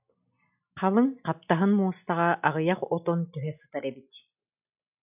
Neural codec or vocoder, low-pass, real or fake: none; 3.6 kHz; real